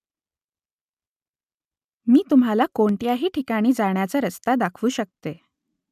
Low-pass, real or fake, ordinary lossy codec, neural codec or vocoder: 14.4 kHz; real; none; none